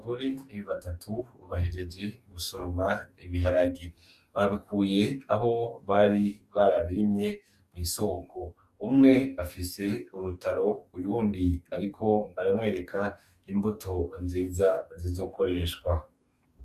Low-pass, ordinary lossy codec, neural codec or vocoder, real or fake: 14.4 kHz; Opus, 64 kbps; codec, 44.1 kHz, 2.6 kbps, DAC; fake